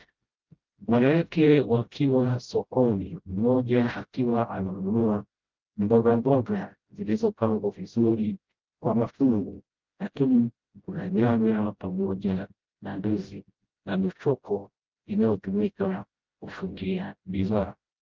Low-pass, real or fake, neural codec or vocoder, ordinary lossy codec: 7.2 kHz; fake; codec, 16 kHz, 0.5 kbps, FreqCodec, smaller model; Opus, 32 kbps